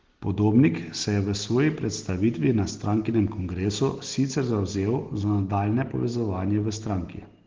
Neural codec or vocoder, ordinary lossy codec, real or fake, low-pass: none; Opus, 16 kbps; real; 7.2 kHz